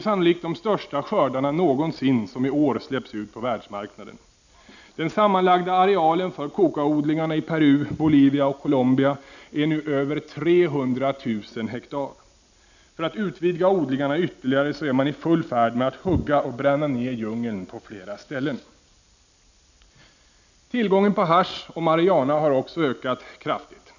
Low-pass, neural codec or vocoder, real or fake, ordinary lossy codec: 7.2 kHz; none; real; none